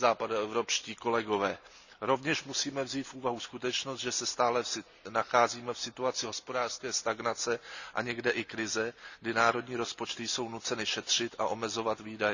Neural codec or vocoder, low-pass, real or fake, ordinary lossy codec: none; 7.2 kHz; real; none